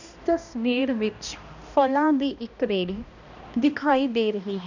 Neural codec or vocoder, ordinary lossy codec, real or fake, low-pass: codec, 16 kHz, 1 kbps, X-Codec, HuBERT features, trained on balanced general audio; none; fake; 7.2 kHz